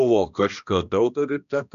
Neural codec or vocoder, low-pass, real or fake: codec, 16 kHz, 1 kbps, X-Codec, HuBERT features, trained on balanced general audio; 7.2 kHz; fake